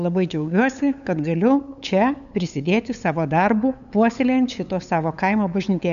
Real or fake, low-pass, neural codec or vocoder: fake; 7.2 kHz; codec, 16 kHz, 8 kbps, FunCodec, trained on LibriTTS, 25 frames a second